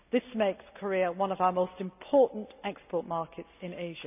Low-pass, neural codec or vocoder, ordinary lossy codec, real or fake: 3.6 kHz; none; none; real